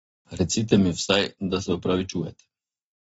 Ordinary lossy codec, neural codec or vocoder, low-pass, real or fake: AAC, 24 kbps; none; 19.8 kHz; real